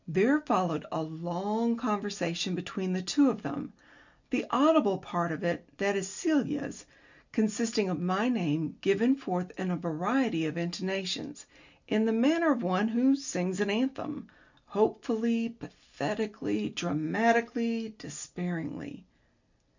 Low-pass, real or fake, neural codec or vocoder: 7.2 kHz; real; none